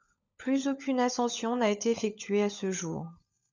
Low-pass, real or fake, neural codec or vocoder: 7.2 kHz; fake; codec, 16 kHz, 16 kbps, FunCodec, trained on LibriTTS, 50 frames a second